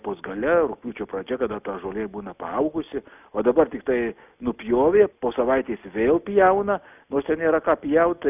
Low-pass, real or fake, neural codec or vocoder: 3.6 kHz; real; none